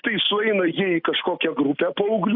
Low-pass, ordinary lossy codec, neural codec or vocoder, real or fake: 5.4 kHz; AAC, 48 kbps; none; real